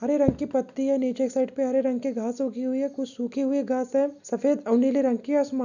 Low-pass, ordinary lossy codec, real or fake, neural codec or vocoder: 7.2 kHz; none; real; none